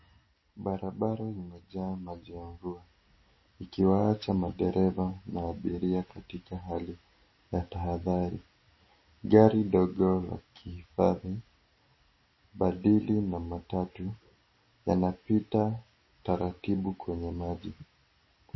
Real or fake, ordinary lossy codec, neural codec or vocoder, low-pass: real; MP3, 24 kbps; none; 7.2 kHz